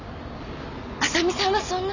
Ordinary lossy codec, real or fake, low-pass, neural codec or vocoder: none; real; 7.2 kHz; none